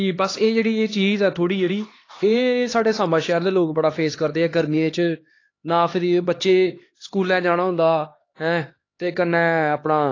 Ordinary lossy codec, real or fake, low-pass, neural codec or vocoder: AAC, 32 kbps; fake; 7.2 kHz; codec, 16 kHz, 2 kbps, X-Codec, HuBERT features, trained on LibriSpeech